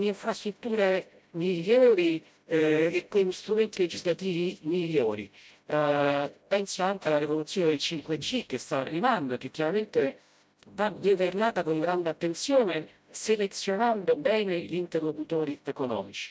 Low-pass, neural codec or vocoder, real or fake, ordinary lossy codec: none; codec, 16 kHz, 0.5 kbps, FreqCodec, smaller model; fake; none